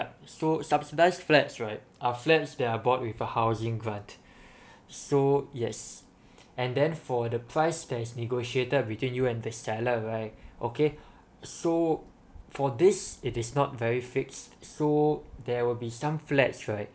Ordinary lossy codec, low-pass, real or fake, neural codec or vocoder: none; none; real; none